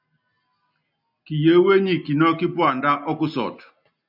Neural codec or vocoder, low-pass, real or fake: none; 5.4 kHz; real